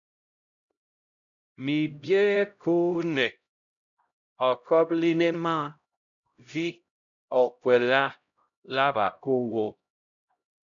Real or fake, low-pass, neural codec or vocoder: fake; 7.2 kHz; codec, 16 kHz, 0.5 kbps, X-Codec, HuBERT features, trained on LibriSpeech